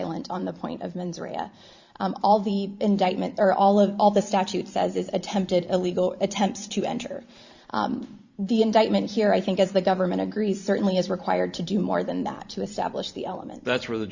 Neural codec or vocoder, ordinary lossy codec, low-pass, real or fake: vocoder, 44.1 kHz, 128 mel bands every 512 samples, BigVGAN v2; Opus, 64 kbps; 7.2 kHz; fake